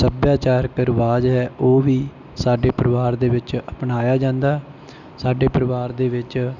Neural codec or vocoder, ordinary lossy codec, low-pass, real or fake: none; none; 7.2 kHz; real